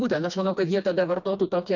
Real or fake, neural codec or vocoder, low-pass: fake; codec, 16 kHz, 2 kbps, FreqCodec, smaller model; 7.2 kHz